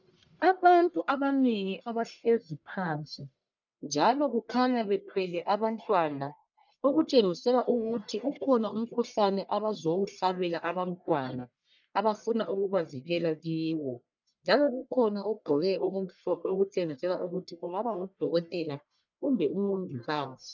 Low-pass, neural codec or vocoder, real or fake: 7.2 kHz; codec, 44.1 kHz, 1.7 kbps, Pupu-Codec; fake